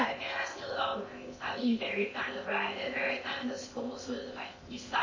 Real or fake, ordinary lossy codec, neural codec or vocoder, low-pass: fake; MP3, 32 kbps; codec, 16 kHz in and 24 kHz out, 0.6 kbps, FocalCodec, streaming, 2048 codes; 7.2 kHz